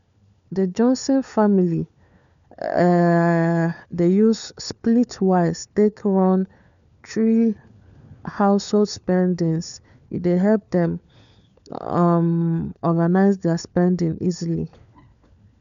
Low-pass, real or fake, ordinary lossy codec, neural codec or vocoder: 7.2 kHz; fake; none; codec, 16 kHz, 4 kbps, FunCodec, trained on LibriTTS, 50 frames a second